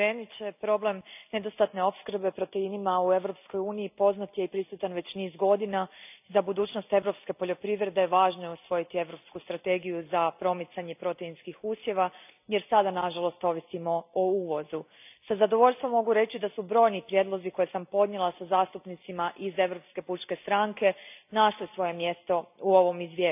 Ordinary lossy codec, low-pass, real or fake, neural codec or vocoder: none; 3.6 kHz; real; none